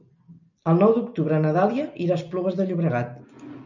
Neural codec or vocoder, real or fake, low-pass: none; real; 7.2 kHz